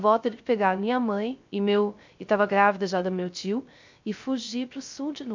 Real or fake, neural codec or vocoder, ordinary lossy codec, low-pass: fake; codec, 16 kHz, 0.3 kbps, FocalCodec; MP3, 64 kbps; 7.2 kHz